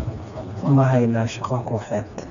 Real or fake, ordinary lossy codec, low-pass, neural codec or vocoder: fake; none; 7.2 kHz; codec, 16 kHz, 2 kbps, FreqCodec, smaller model